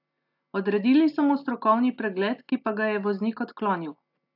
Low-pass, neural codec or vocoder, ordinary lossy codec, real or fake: 5.4 kHz; none; AAC, 48 kbps; real